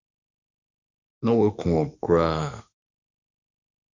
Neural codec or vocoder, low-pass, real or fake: autoencoder, 48 kHz, 32 numbers a frame, DAC-VAE, trained on Japanese speech; 7.2 kHz; fake